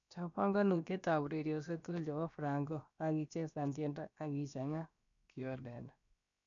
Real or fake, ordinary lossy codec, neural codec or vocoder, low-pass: fake; none; codec, 16 kHz, about 1 kbps, DyCAST, with the encoder's durations; 7.2 kHz